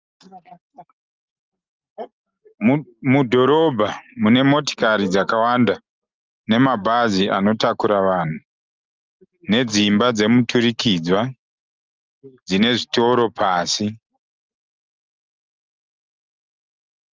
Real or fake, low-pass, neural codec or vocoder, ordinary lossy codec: real; 7.2 kHz; none; Opus, 32 kbps